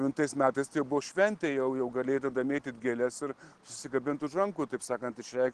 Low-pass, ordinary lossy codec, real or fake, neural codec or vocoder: 9.9 kHz; Opus, 16 kbps; real; none